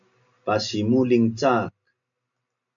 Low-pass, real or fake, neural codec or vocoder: 7.2 kHz; real; none